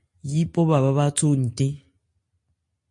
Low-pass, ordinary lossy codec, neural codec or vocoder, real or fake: 10.8 kHz; MP3, 48 kbps; none; real